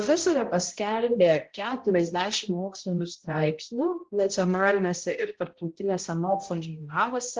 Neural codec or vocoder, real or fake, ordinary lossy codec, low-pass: codec, 16 kHz, 0.5 kbps, X-Codec, HuBERT features, trained on balanced general audio; fake; Opus, 16 kbps; 7.2 kHz